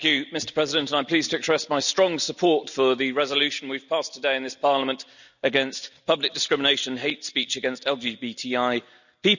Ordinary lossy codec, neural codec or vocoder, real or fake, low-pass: none; none; real; 7.2 kHz